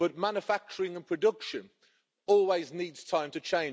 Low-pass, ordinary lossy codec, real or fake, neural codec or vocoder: none; none; real; none